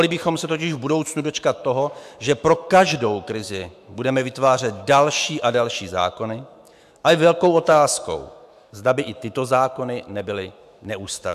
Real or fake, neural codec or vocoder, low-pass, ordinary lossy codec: fake; autoencoder, 48 kHz, 128 numbers a frame, DAC-VAE, trained on Japanese speech; 14.4 kHz; AAC, 96 kbps